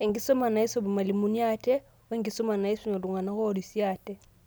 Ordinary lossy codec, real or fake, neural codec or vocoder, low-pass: none; real; none; none